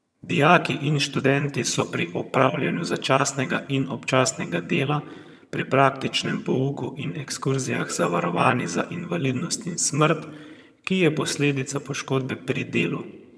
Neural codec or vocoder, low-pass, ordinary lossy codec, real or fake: vocoder, 22.05 kHz, 80 mel bands, HiFi-GAN; none; none; fake